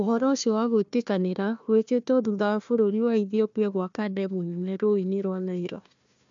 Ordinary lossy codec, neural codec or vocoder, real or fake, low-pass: MP3, 64 kbps; codec, 16 kHz, 1 kbps, FunCodec, trained on Chinese and English, 50 frames a second; fake; 7.2 kHz